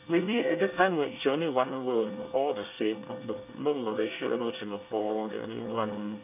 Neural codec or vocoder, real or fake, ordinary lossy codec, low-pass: codec, 24 kHz, 1 kbps, SNAC; fake; none; 3.6 kHz